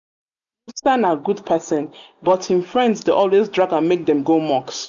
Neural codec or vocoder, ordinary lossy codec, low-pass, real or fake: none; none; 7.2 kHz; real